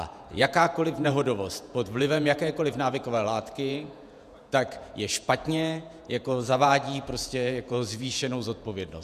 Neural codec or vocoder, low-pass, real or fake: vocoder, 48 kHz, 128 mel bands, Vocos; 14.4 kHz; fake